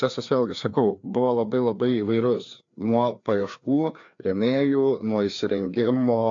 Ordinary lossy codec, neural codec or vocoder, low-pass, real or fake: AAC, 48 kbps; codec, 16 kHz, 2 kbps, FreqCodec, larger model; 7.2 kHz; fake